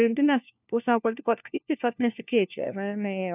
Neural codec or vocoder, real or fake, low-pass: codec, 16 kHz, 1 kbps, FunCodec, trained on LibriTTS, 50 frames a second; fake; 3.6 kHz